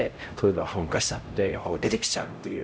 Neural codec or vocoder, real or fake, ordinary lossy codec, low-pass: codec, 16 kHz, 0.5 kbps, X-Codec, HuBERT features, trained on LibriSpeech; fake; none; none